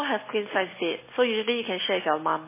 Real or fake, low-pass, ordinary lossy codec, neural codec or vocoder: real; 3.6 kHz; MP3, 16 kbps; none